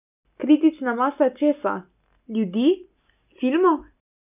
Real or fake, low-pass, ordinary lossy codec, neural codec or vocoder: real; 3.6 kHz; none; none